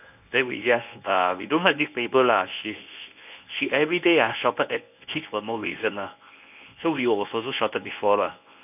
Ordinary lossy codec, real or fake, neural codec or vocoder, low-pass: none; fake; codec, 24 kHz, 0.9 kbps, WavTokenizer, medium speech release version 1; 3.6 kHz